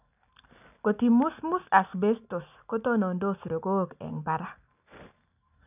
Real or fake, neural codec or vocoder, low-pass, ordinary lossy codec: real; none; 3.6 kHz; none